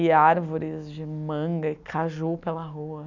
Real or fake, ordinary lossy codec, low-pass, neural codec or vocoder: real; none; 7.2 kHz; none